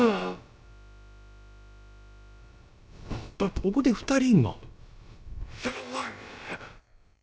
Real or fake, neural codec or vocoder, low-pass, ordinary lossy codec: fake; codec, 16 kHz, about 1 kbps, DyCAST, with the encoder's durations; none; none